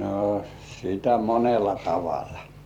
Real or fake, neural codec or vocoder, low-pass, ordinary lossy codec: fake; vocoder, 44.1 kHz, 128 mel bands every 256 samples, BigVGAN v2; 19.8 kHz; none